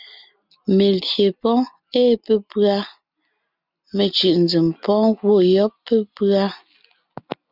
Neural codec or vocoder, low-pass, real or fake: none; 5.4 kHz; real